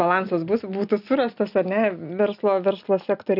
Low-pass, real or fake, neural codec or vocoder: 5.4 kHz; real; none